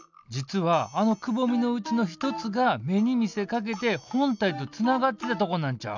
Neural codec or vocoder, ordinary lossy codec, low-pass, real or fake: none; none; 7.2 kHz; real